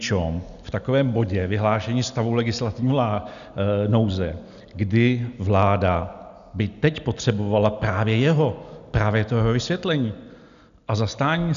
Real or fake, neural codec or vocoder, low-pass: real; none; 7.2 kHz